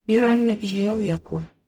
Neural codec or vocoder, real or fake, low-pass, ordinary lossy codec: codec, 44.1 kHz, 0.9 kbps, DAC; fake; 19.8 kHz; none